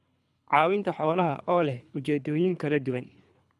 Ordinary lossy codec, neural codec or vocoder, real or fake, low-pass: none; codec, 24 kHz, 3 kbps, HILCodec; fake; none